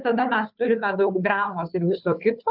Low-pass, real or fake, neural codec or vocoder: 5.4 kHz; fake; codec, 16 kHz, 2 kbps, FunCodec, trained on Chinese and English, 25 frames a second